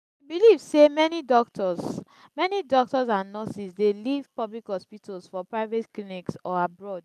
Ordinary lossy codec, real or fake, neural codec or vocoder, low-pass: none; real; none; 14.4 kHz